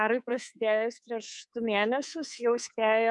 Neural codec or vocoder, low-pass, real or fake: codec, 24 kHz, 3.1 kbps, DualCodec; 10.8 kHz; fake